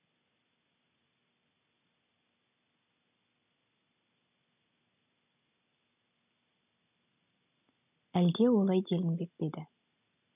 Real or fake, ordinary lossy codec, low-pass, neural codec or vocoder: real; none; 3.6 kHz; none